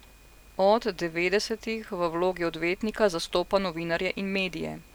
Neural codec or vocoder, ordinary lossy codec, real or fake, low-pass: none; none; real; none